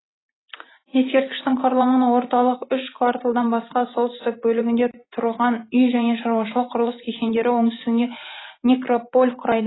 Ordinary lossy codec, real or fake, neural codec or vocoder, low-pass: AAC, 16 kbps; fake; vocoder, 44.1 kHz, 128 mel bands every 512 samples, BigVGAN v2; 7.2 kHz